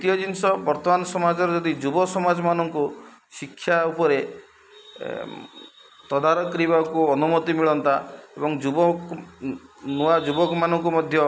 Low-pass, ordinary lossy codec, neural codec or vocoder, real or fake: none; none; none; real